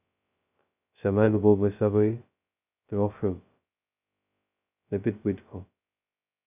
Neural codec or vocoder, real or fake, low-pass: codec, 16 kHz, 0.2 kbps, FocalCodec; fake; 3.6 kHz